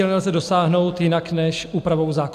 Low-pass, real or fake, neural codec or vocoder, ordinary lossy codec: 14.4 kHz; real; none; AAC, 96 kbps